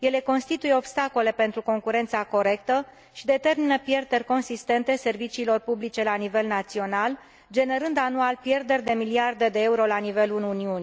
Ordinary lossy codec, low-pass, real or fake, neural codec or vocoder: none; none; real; none